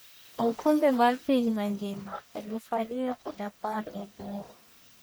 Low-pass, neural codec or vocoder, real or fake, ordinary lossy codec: none; codec, 44.1 kHz, 1.7 kbps, Pupu-Codec; fake; none